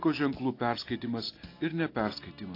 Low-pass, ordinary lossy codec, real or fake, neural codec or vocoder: 5.4 kHz; AAC, 32 kbps; real; none